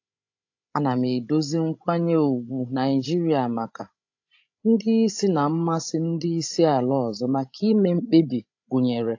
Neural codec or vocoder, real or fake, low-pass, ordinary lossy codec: codec, 16 kHz, 16 kbps, FreqCodec, larger model; fake; 7.2 kHz; AAC, 48 kbps